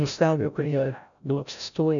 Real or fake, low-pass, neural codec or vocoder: fake; 7.2 kHz; codec, 16 kHz, 0.5 kbps, FreqCodec, larger model